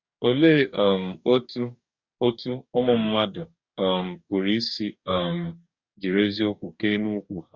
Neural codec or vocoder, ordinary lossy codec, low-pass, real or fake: codec, 44.1 kHz, 2.6 kbps, DAC; Opus, 64 kbps; 7.2 kHz; fake